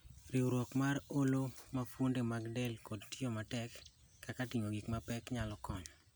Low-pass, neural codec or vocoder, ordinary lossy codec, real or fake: none; none; none; real